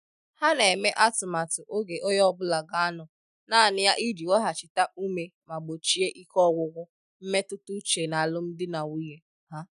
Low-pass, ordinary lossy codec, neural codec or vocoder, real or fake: 10.8 kHz; none; none; real